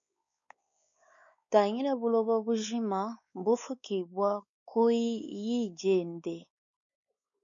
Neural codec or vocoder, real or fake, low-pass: codec, 16 kHz, 4 kbps, X-Codec, WavLM features, trained on Multilingual LibriSpeech; fake; 7.2 kHz